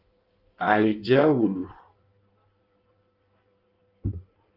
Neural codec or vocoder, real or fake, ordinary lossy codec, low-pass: codec, 16 kHz in and 24 kHz out, 1.1 kbps, FireRedTTS-2 codec; fake; Opus, 24 kbps; 5.4 kHz